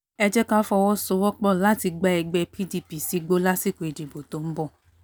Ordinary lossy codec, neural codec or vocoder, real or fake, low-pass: none; none; real; none